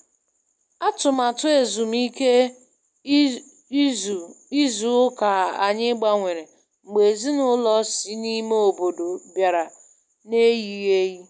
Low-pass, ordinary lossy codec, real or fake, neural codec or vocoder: none; none; real; none